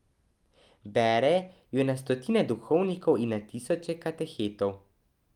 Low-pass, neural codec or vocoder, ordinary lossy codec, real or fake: 19.8 kHz; none; Opus, 32 kbps; real